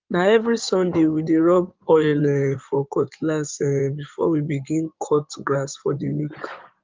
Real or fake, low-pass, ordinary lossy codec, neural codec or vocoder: fake; 7.2 kHz; Opus, 32 kbps; codec, 16 kHz in and 24 kHz out, 2.2 kbps, FireRedTTS-2 codec